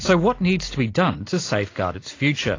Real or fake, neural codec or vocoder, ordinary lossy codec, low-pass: real; none; AAC, 32 kbps; 7.2 kHz